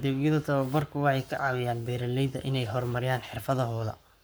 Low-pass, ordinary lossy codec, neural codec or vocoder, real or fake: none; none; codec, 44.1 kHz, 7.8 kbps, Pupu-Codec; fake